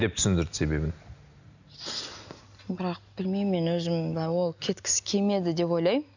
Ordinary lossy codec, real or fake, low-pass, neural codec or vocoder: none; real; 7.2 kHz; none